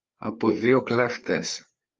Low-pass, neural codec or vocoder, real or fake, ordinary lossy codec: 7.2 kHz; codec, 16 kHz, 4 kbps, FreqCodec, larger model; fake; Opus, 32 kbps